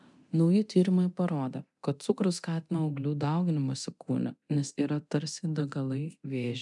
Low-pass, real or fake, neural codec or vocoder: 10.8 kHz; fake; codec, 24 kHz, 0.9 kbps, DualCodec